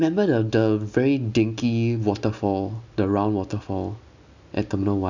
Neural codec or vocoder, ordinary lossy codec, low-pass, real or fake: none; none; 7.2 kHz; real